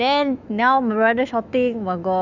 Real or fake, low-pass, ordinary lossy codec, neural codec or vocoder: fake; 7.2 kHz; none; autoencoder, 48 kHz, 128 numbers a frame, DAC-VAE, trained on Japanese speech